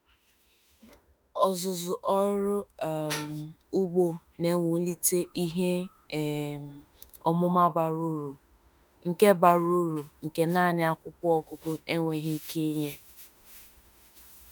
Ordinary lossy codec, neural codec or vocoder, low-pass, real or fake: none; autoencoder, 48 kHz, 32 numbers a frame, DAC-VAE, trained on Japanese speech; none; fake